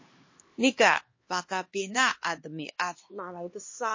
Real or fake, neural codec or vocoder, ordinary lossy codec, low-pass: fake; codec, 16 kHz, 2 kbps, X-Codec, HuBERT features, trained on LibriSpeech; MP3, 32 kbps; 7.2 kHz